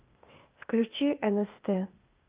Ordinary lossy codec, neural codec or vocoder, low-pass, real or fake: Opus, 32 kbps; codec, 16 kHz, 0.8 kbps, ZipCodec; 3.6 kHz; fake